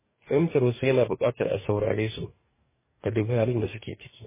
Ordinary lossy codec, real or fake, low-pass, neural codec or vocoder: MP3, 16 kbps; fake; 3.6 kHz; codec, 44.1 kHz, 2.6 kbps, DAC